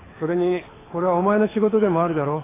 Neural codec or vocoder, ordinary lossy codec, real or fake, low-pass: codec, 24 kHz, 6 kbps, HILCodec; AAC, 16 kbps; fake; 3.6 kHz